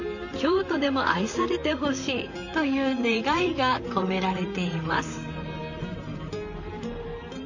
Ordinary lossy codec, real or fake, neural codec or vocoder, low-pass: none; fake; vocoder, 44.1 kHz, 128 mel bands, Pupu-Vocoder; 7.2 kHz